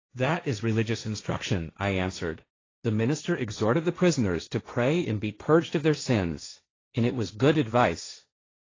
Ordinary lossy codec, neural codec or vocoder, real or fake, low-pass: AAC, 32 kbps; codec, 16 kHz, 1.1 kbps, Voila-Tokenizer; fake; 7.2 kHz